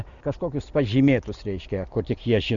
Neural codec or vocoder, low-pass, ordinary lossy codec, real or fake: none; 7.2 kHz; Opus, 64 kbps; real